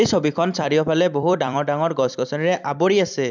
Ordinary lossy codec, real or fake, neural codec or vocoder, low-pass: none; fake; vocoder, 44.1 kHz, 128 mel bands every 256 samples, BigVGAN v2; 7.2 kHz